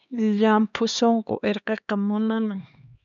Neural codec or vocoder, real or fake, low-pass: codec, 16 kHz, 2 kbps, X-Codec, HuBERT features, trained on LibriSpeech; fake; 7.2 kHz